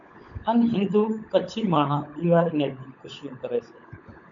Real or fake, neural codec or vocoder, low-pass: fake; codec, 16 kHz, 16 kbps, FunCodec, trained on LibriTTS, 50 frames a second; 7.2 kHz